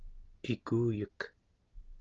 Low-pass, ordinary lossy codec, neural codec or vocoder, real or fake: 7.2 kHz; Opus, 16 kbps; none; real